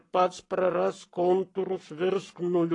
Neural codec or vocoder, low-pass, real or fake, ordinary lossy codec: codec, 44.1 kHz, 7.8 kbps, Pupu-Codec; 10.8 kHz; fake; AAC, 32 kbps